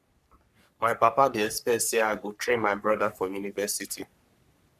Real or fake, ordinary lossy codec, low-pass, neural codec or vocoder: fake; none; 14.4 kHz; codec, 44.1 kHz, 3.4 kbps, Pupu-Codec